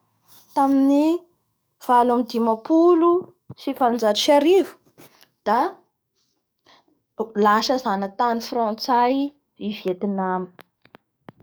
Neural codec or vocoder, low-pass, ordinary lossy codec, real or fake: codec, 44.1 kHz, 7.8 kbps, DAC; none; none; fake